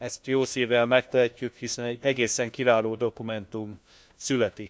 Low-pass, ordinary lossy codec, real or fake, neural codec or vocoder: none; none; fake; codec, 16 kHz, 1 kbps, FunCodec, trained on LibriTTS, 50 frames a second